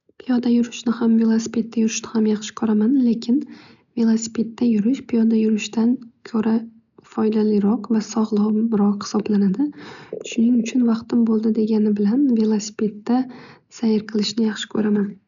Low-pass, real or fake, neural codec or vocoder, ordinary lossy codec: 7.2 kHz; real; none; none